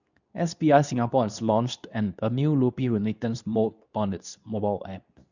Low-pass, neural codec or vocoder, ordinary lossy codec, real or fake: 7.2 kHz; codec, 24 kHz, 0.9 kbps, WavTokenizer, medium speech release version 2; none; fake